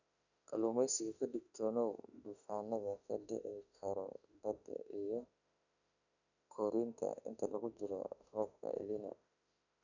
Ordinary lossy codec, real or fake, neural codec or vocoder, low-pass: none; fake; autoencoder, 48 kHz, 32 numbers a frame, DAC-VAE, trained on Japanese speech; 7.2 kHz